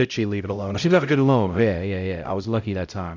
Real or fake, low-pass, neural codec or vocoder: fake; 7.2 kHz; codec, 16 kHz, 0.5 kbps, X-Codec, HuBERT features, trained on LibriSpeech